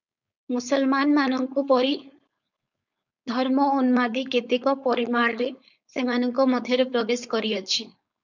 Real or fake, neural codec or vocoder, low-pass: fake; codec, 16 kHz, 4.8 kbps, FACodec; 7.2 kHz